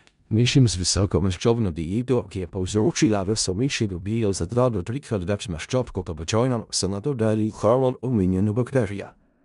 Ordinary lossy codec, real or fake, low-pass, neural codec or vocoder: none; fake; 10.8 kHz; codec, 16 kHz in and 24 kHz out, 0.4 kbps, LongCat-Audio-Codec, four codebook decoder